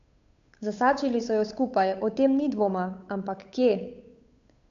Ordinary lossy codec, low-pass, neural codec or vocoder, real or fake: AAC, 64 kbps; 7.2 kHz; codec, 16 kHz, 8 kbps, FunCodec, trained on Chinese and English, 25 frames a second; fake